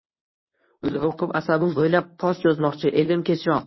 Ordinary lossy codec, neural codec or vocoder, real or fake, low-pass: MP3, 24 kbps; codec, 24 kHz, 0.9 kbps, WavTokenizer, medium speech release version 1; fake; 7.2 kHz